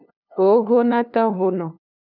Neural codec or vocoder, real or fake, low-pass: codec, 16 kHz, 2 kbps, FunCodec, trained on LibriTTS, 25 frames a second; fake; 5.4 kHz